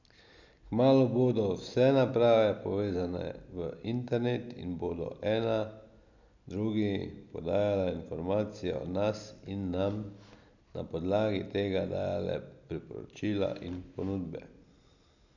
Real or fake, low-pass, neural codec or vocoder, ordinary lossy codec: real; 7.2 kHz; none; none